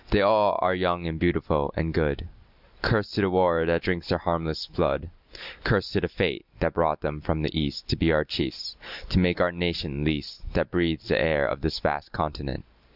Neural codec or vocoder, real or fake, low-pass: none; real; 5.4 kHz